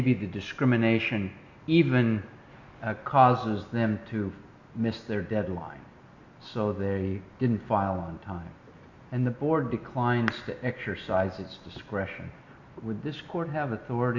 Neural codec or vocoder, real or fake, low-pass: none; real; 7.2 kHz